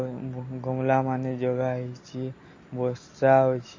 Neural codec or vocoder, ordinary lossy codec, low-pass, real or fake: none; MP3, 32 kbps; 7.2 kHz; real